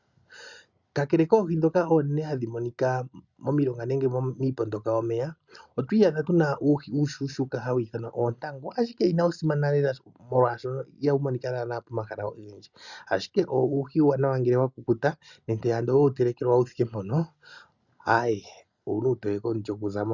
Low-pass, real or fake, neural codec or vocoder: 7.2 kHz; real; none